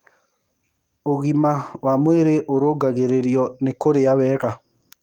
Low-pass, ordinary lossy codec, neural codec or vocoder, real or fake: 19.8 kHz; Opus, 32 kbps; codec, 44.1 kHz, 7.8 kbps, DAC; fake